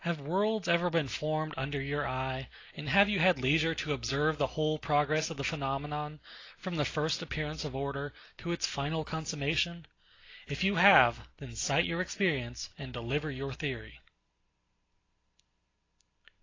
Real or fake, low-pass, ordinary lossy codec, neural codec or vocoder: real; 7.2 kHz; AAC, 32 kbps; none